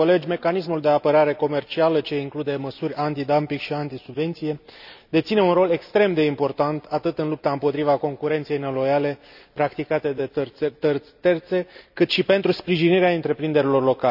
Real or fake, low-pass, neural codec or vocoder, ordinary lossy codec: real; 5.4 kHz; none; none